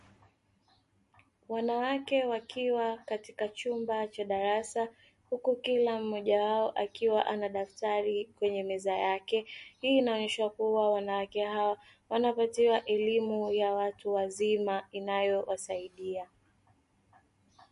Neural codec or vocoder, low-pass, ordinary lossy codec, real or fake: none; 14.4 kHz; MP3, 48 kbps; real